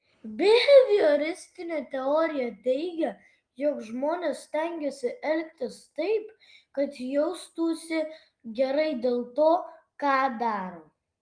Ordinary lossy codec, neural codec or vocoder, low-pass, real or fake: Opus, 32 kbps; none; 9.9 kHz; real